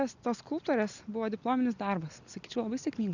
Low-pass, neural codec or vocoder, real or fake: 7.2 kHz; none; real